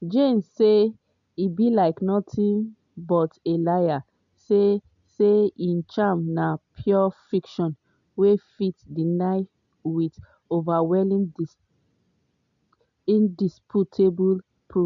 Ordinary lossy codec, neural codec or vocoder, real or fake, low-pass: none; none; real; 7.2 kHz